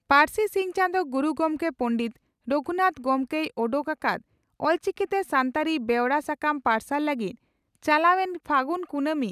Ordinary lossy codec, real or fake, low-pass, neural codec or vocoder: none; real; 14.4 kHz; none